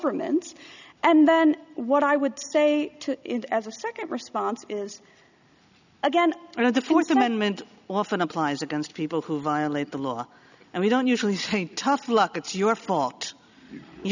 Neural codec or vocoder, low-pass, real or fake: none; 7.2 kHz; real